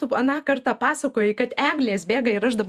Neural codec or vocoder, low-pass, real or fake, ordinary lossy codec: vocoder, 48 kHz, 128 mel bands, Vocos; 14.4 kHz; fake; Opus, 64 kbps